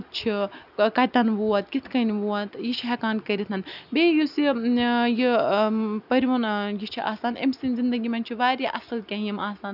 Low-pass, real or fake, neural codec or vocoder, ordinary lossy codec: 5.4 kHz; real; none; none